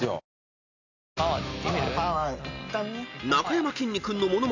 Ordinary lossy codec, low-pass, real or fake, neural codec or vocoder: none; 7.2 kHz; real; none